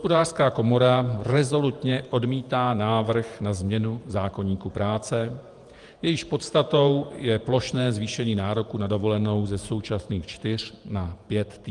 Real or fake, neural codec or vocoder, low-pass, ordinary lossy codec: fake; vocoder, 48 kHz, 128 mel bands, Vocos; 10.8 kHz; Opus, 32 kbps